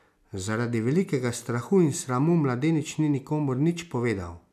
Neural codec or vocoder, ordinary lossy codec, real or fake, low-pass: none; none; real; 14.4 kHz